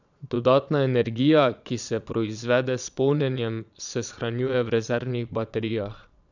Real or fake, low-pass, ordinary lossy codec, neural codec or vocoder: fake; 7.2 kHz; none; vocoder, 22.05 kHz, 80 mel bands, Vocos